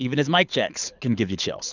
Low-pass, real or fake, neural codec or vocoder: 7.2 kHz; fake; codec, 24 kHz, 6 kbps, HILCodec